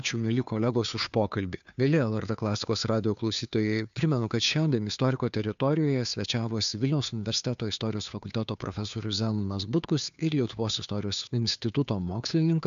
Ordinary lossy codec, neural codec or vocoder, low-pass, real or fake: AAC, 96 kbps; codec, 16 kHz, 2 kbps, FunCodec, trained on Chinese and English, 25 frames a second; 7.2 kHz; fake